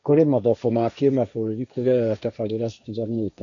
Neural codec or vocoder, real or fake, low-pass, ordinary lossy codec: codec, 16 kHz, 1.1 kbps, Voila-Tokenizer; fake; 7.2 kHz; AAC, 64 kbps